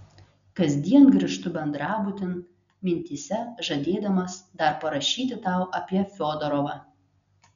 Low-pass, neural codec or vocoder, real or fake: 7.2 kHz; none; real